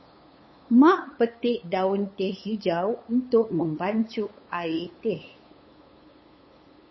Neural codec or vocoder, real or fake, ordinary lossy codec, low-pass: codec, 16 kHz, 8 kbps, FunCodec, trained on LibriTTS, 25 frames a second; fake; MP3, 24 kbps; 7.2 kHz